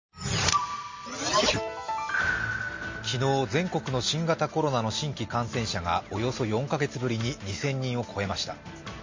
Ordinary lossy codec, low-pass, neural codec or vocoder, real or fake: MP3, 32 kbps; 7.2 kHz; none; real